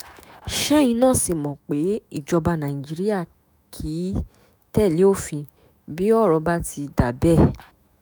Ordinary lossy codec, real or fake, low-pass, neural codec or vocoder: none; fake; none; autoencoder, 48 kHz, 128 numbers a frame, DAC-VAE, trained on Japanese speech